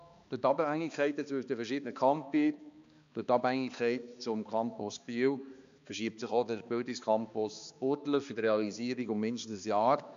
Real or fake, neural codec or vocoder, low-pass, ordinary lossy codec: fake; codec, 16 kHz, 2 kbps, X-Codec, HuBERT features, trained on balanced general audio; 7.2 kHz; MP3, 64 kbps